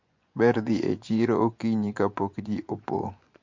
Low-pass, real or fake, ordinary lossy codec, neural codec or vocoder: 7.2 kHz; fake; MP3, 48 kbps; vocoder, 24 kHz, 100 mel bands, Vocos